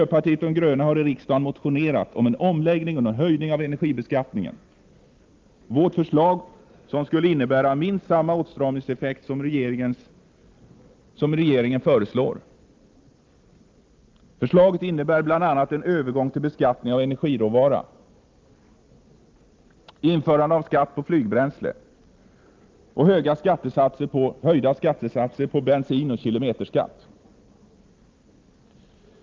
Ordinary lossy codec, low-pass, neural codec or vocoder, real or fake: Opus, 32 kbps; 7.2 kHz; none; real